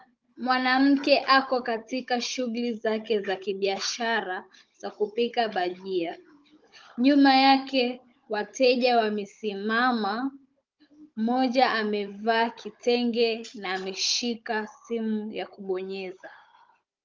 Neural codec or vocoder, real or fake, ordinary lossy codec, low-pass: codec, 16 kHz, 16 kbps, FunCodec, trained on Chinese and English, 50 frames a second; fake; Opus, 24 kbps; 7.2 kHz